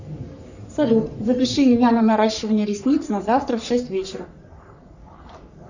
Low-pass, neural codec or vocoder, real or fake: 7.2 kHz; codec, 44.1 kHz, 3.4 kbps, Pupu-Codec; fake